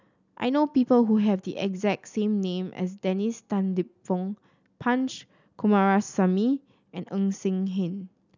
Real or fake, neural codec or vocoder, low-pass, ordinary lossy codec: real; none; 7.2 kHz; none